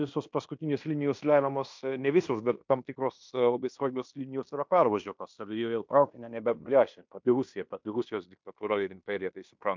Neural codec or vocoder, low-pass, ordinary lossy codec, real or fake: codec, 16 kHz in and 24 kHz out, 0.9 kbps, LongCat-Audio-Codec, fine tuned four codebook decoder; 7.2 kHz; MP3, 64 kbps; fake